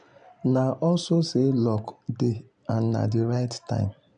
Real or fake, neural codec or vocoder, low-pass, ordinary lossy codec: real; none; 9.9 kHz; none